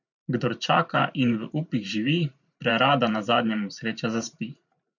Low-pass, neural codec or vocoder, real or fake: 7.2 kHz; vocoder, 44.1 kHz, 128 mel bands every 512 samples, BigVGAN v2; fake